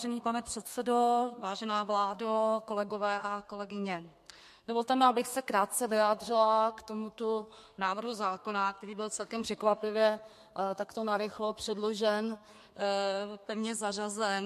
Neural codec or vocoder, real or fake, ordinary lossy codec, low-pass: codec, 32 kHz, 1.9 kbps, SNAC; fake; MP3, 64 kbps; 14.4 kHz